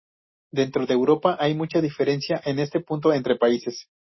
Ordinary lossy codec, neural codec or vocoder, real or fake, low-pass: MP3, 24 kbps; none; real; 7.2 kHz